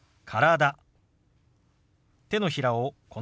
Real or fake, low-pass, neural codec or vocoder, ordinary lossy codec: real; none; none; none